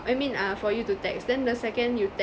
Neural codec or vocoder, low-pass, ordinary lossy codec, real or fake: none; none; none; real